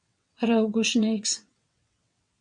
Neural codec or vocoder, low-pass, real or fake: vocoder, 22.05 kHz, 80 mel bands, WaveNeXt; 9.9 kHz; fake